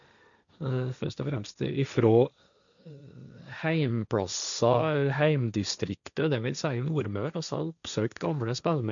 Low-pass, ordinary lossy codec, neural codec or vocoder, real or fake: 7.2 kHz; none; codec, 16 kHz, 1.1 kbps, Voila-Tokenizer; fake